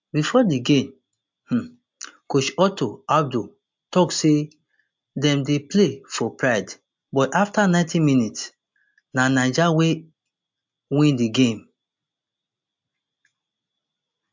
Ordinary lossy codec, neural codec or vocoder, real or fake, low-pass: MP3, 64 kbps; none; real; 7.2 kHz